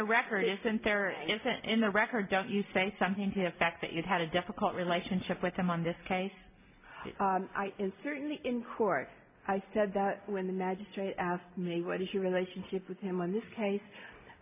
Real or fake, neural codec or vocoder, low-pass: real; none; 3.6 kHz